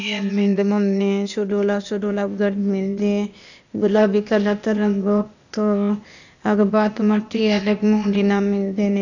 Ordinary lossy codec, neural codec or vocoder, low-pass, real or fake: none; codec, 16 kHz, 0.8 kbps, ZipCodec; 7.2 kHz; fake